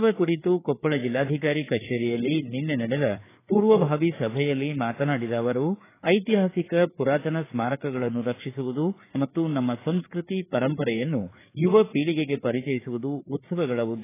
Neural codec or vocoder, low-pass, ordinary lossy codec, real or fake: codec, 16 kHz, 4 kbps, FreqCodec, larger model; 3.6 kHz; AAC, 16 kbps; fake